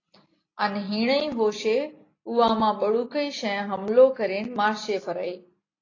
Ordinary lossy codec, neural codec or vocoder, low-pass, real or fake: AAC, 32 kbps; none; 7.2 kHz; real